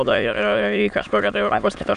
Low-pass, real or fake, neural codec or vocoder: 9.9 kHz; fake; autoencoder, 22.05 kHz, a latent of 192 numbers a frame, VITS, trained on many speakers